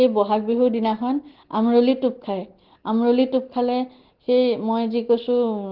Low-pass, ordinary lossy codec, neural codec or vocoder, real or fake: 5.4 kHz; Opus, 16 kbps; none; real